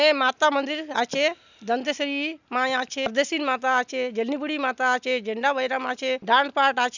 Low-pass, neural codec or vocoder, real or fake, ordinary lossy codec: 7.2 kHz; none; real; none